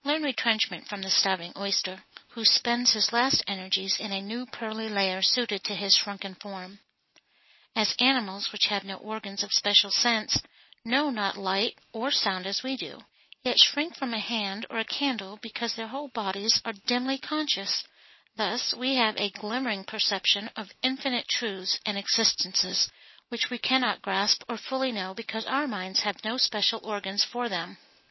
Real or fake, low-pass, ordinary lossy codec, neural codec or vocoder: real; 7.2 kHz; MP3, 24 kbps; none